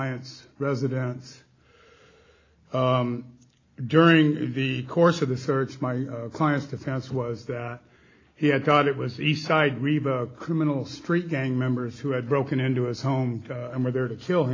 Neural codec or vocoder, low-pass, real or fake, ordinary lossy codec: none; 7.2 kHz; real; AAC, 32 kbps